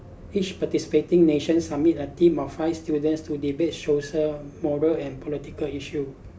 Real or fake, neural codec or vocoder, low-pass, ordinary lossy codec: real; none; none; none